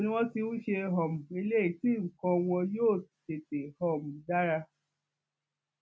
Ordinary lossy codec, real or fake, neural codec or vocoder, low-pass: none; real; none; none